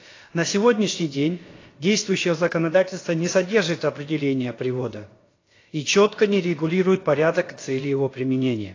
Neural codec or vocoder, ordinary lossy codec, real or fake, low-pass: codec, 16 kHz, about 1 kbps, DyCAST, with the encoder's durations; AAC, 32 kbps; fake; 7.2 kHz